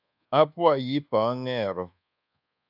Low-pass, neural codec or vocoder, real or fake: 5.4 kHz; codec, 24 kHz, 1.2 kbps, DualCodec; fake